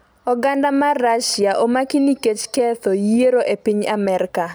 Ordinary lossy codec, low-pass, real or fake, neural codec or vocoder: none; none; real; none